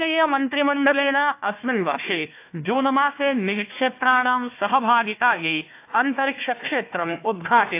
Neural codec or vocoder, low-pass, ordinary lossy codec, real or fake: codec, 16 kHz, 1 kbps, FunCodec, trained on Chinese and English, 50 frames a second; 3.6 kHz; AAC, 24 kbps; fake